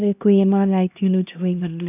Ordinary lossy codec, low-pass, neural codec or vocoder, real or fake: none; 3.6 kHz; codec, 16 kHz, 1 kbps, X-Codec, HuBERT features, trained on LibriSpeech; fake